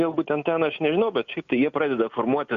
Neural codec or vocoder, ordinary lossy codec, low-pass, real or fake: none; Opus, 64 kbps; 7.2 kHz; real